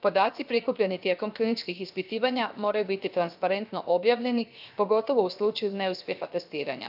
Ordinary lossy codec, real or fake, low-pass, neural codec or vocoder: none; fake; 5.4 kHz; codec, 16 kHz, 0.7 kbps, FocalCodec